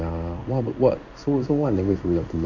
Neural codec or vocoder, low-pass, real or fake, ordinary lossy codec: codec, 16 kHz in and 24 kHz out, 1 kbps, XY-Tokenizer; 7.2 kHz; fake; none